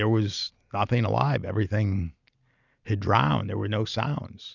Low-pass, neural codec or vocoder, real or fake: 7.2 kHz; none; real